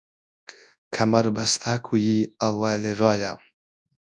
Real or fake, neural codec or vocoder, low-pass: fake; codec, 24 kHz, 0.9 kbps, WavTokenizer, large speech release; 10.8 kHz